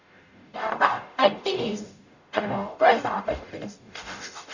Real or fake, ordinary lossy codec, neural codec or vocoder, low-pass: fake; none; codec, 44.1 kHz, 0.9 kbps, DAC; 7.2 kHz